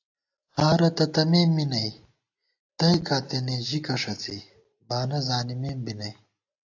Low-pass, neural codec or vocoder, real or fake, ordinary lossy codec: 7.2 kHz; none; real; AAC, 48 kbps